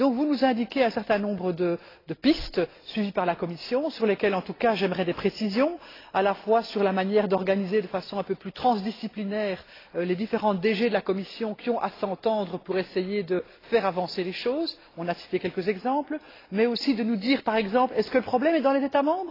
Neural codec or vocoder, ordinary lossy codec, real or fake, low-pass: none; AAC, 24 kbps; real; 5.4 kHz